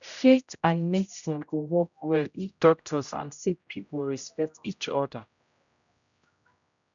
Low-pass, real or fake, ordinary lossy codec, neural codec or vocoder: 7.2 kHz; fake; none; codec, 16 kHz, 0.5 kbps, X-Codec, HuBERT features, trained on general audio